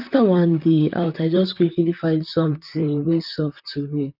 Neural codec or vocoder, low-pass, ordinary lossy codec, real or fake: vocoder, 44.1 kHz, 128 mel bands, Pupu-Vocoder; 5.4 kHz; none; fake